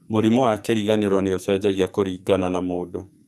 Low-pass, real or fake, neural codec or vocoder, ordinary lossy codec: 14.4 kHz; fake; codec, 44.1 kHz, 2.6 kbps, SNAC; none